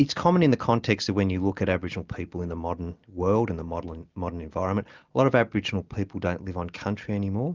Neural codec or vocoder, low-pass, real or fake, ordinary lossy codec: none; 7.2 kHz; real; Opus, 32 kbps